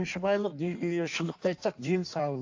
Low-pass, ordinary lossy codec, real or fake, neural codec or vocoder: 7.2 kHz; AAC, 48 kbps; fake; codec, 16 kHz in and 24 kHz out, 1.1 kbps, FireRedTTS-2 codec